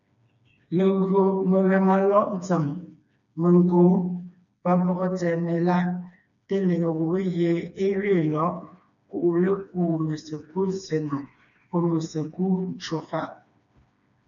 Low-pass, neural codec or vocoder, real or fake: 7.2 kHz; codec, 16 kHz, 2 kbps, FreqCodec, smaller model; fake